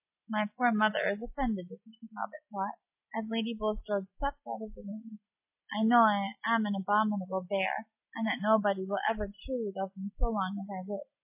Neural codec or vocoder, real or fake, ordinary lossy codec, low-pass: none; real; MP3, 24 kbps; 3.6 kHz